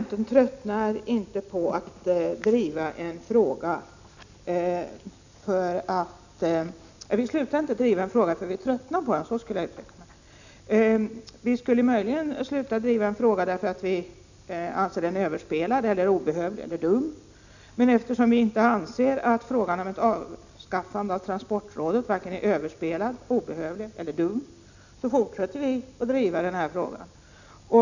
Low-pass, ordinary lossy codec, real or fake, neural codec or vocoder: 7.2 kHz; none; real; none